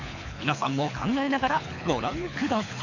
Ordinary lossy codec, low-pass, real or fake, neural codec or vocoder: none; 7.2 kHz; fake; codec, 24 kHz, 6 kbps, HILCodec